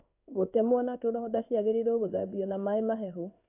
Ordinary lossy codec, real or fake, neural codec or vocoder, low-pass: AAC, 32 kbps; fake; codec, 16 kHz in and 24 kHz out, 1 kbps, XY-Tokenizer; 3.6 kHz